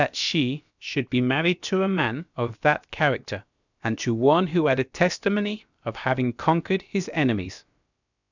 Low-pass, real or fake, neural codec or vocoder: 7.2 kHz; fake; codec, 16 kHz, about 1 kbps, DyCAST, with the encoder's durations